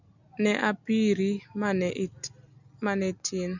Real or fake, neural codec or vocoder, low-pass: real; none; 7.2 kHz